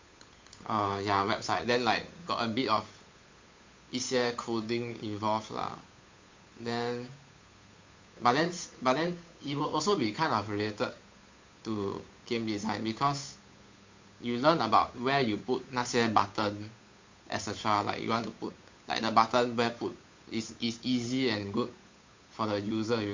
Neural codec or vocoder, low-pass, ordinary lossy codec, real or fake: codec, 16 kHz, 8 kbps, FunCodec, trained on Chinese and English, 25 frames a second; 7.2 kHz; MP3, 48 kbps; fake